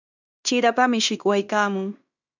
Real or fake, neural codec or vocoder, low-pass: fake; codec, 16 kHz in and 24 kHz out, 0.9 kbps, LongCat-Audio-Codec, fine tuned four codebook decoder; 7.2 kHz